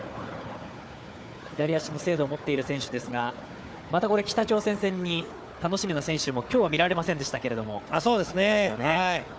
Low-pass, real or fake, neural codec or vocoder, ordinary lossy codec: none; fake; codec, 16 kHz, 4 kbps, FunCodec, trained on Chinese and English, 50 frames a second; none